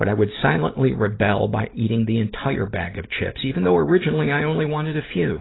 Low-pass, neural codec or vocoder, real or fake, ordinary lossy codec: 7.2 kHz; none; real; AAC, 16 kbps